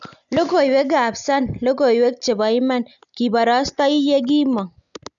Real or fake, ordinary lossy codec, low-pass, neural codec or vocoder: real; none; 7.2 kHz; none